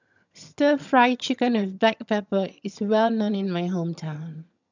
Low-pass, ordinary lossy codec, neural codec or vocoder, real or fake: 7.2 kHz; none; vocoder, 22.05 kHz, 80 mel bands, HiFi-GAN; fake